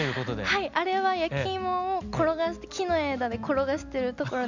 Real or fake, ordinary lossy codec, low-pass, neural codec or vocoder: real; none; 7.2 kHz; none